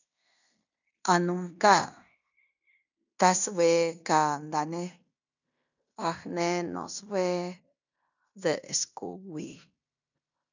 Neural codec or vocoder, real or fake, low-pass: codec, 16 kHz in and 24 kHz out, 0.9 kbps, LongCat-Audio-Codec, fine tuned four codebook decoder; fake; 7.2 kHz